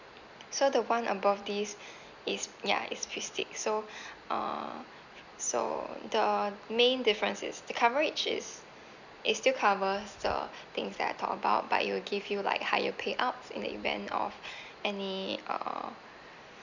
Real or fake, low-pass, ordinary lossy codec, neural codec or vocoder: real; 7.2 kHz; none; none